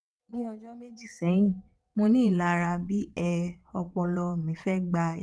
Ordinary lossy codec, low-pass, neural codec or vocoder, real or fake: none; none; vocoder, 22.05 kHz, 80 mel bands, WaveNeXt; fake